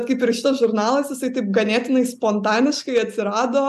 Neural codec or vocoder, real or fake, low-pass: none; real; 14.4 kHz